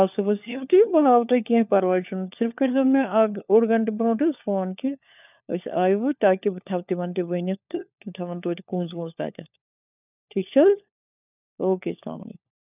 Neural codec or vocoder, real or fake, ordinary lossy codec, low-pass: codec, 16 kHz, 4 kbps, FunCodec, trained on LibriTTS, 50 frames a second; fake; none; 3.6 kHz